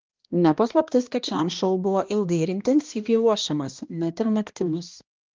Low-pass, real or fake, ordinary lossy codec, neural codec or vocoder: 7.2 kHz; fake; Opus, 24 kbps; codec, 16 kHz, 1 kbps, X-Codec, HuBERT features, trained on balanced general audio